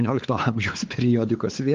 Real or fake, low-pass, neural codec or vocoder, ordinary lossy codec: fake; 7.2 kHz; codec, 16 kHz, 4 kbps, X-Codec, WavLM features, trained on Multilingual LibriSpeech; Opus, 32 kbps